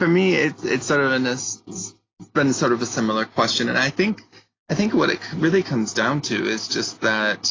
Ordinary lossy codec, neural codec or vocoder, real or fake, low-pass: AAC, 32 kbps; none; real; 7.2 kHz